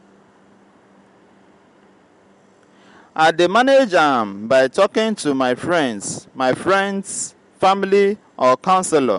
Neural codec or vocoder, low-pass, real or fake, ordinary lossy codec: none; 10.8 kHz; real; MP3, 64 kbps